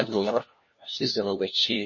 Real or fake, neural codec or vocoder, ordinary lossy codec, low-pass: fake; codec, 16 kHz, 1 kbps, FunCodec, trained on LibriTTS, 50 frames a second; MP3, 32 kbps; 7.2 kHz